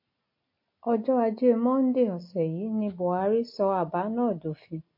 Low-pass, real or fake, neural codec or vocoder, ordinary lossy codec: 5.4 kHz; real; none; MP3, 32 kbps